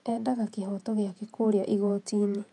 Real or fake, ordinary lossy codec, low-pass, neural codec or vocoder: fake; none; 10.8 kHz; vocoder, 48 kHz, 128 mel bands, Vocos